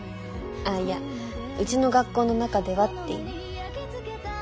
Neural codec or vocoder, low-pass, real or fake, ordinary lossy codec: none; none; real; none